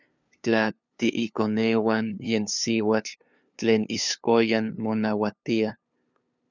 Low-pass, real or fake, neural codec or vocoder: 7.2 kHz; fake; codec, 16 kHz, 2 kbps, FunCodec, trained on LibriTTS, 25 frames a second